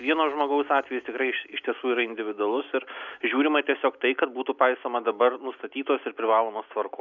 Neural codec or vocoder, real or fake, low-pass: none; real; 7.2 kHz